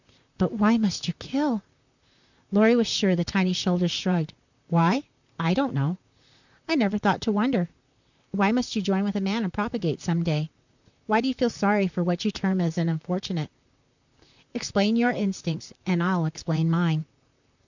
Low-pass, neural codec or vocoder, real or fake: 7.2 kHz; vocoder, 44.1 kHz, 128 mel bands, Pupu-Vocoder; fake